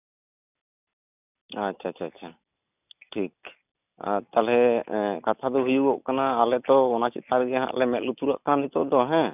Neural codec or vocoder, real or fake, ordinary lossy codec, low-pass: none; real; none; 3.6 kHz